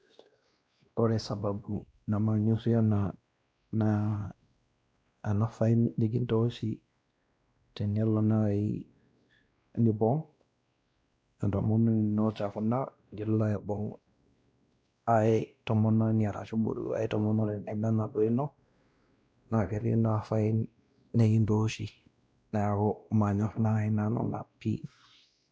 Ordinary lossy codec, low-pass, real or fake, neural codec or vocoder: none; none; fake; codec, 16 kHz, 1 kbps, X-Codec, WavLM features, trained on Multilingual LibriSpeech